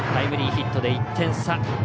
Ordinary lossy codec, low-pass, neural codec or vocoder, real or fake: none; none; none; real